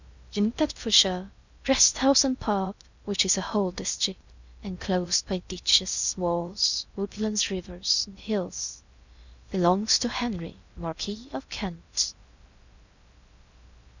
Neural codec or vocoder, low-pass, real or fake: codec, 16 kHz in and 24 kHz out, 0.6 kbps, FocalCodec, streaming, 2048 codes; 7.2 kHz; fake